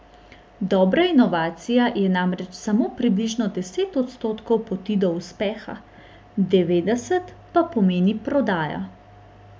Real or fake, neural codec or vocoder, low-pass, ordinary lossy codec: real; none; none; none